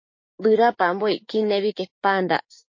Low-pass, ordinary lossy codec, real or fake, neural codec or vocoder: 7.2 kHz; MP3, 32 kbps; fake; codec, 16 kHz, 6 kbps, DAC